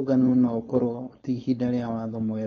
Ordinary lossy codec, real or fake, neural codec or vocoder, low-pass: AAC, 32 kbps; fake; codec, 16 kHz, 4.8 kbps, FACodec; 7.2 kHz